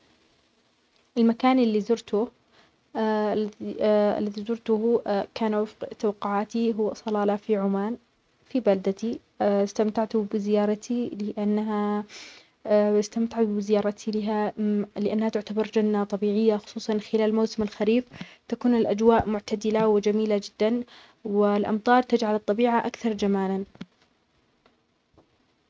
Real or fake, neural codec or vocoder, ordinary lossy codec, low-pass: real; none; none; none